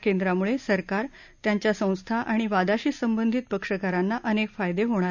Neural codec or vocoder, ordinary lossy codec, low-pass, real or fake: none; none; 7.2 kHz; real